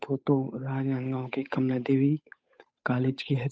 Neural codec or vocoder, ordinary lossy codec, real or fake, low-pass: codec, 16 kHz, 8 kbps, FunCodec, trained on Chinese and English, 25 frames a second; none; fake; none